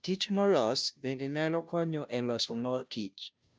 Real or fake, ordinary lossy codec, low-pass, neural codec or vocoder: fake; none; none; codec, 16 kHz, 0.5 kbps, FunCodec, trained on Chinese and English, 25 frames a second